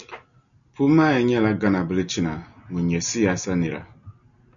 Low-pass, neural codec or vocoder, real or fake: 7.2 kHz; none; real